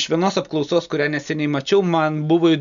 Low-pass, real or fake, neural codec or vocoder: 7.2 kHz; real; none